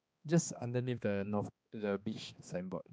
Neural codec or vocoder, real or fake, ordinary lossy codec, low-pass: codec, 16 kHz, 2 kbps, X-Codec, HuBERT features, trained on balanced general audio; fake; none; none